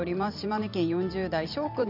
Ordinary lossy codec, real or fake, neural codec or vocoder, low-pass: none; fake; vocoder, 44.1 kHz, 128 mel bands every 512 samples, BigVGAN v2; 5.4 kHz